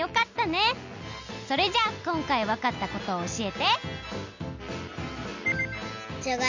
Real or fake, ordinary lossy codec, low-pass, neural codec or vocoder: real; MP3, 64 kbps; 7.2 kHz; none